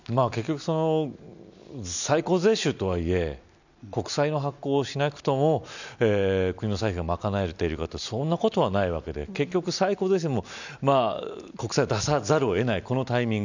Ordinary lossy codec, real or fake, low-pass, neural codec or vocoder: none; real; 7.2 kHz; none